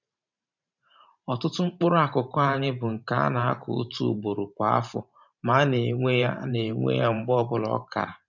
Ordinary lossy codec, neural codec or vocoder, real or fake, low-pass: none; vocoder, 44.1 kHz, 128 mel bands every 512 samples, BigVGAN v2; fake; 7.2 kHz